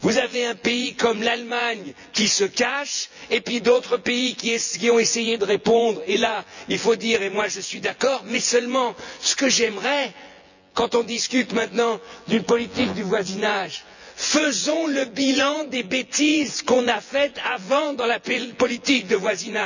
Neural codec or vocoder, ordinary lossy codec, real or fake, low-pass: vocoder, 24 kHz, 100 mel bands, Vocos; none; fake; 7.2 kHz